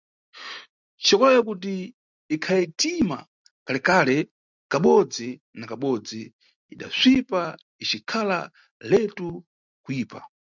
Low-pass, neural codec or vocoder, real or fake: 7.2 kHz; none; real